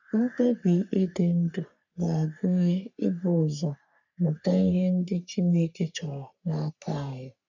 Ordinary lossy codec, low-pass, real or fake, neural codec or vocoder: none; 7.2 kHz; fake; codec, 44.1 kHz, 3.4 kbps, Pupu-Codec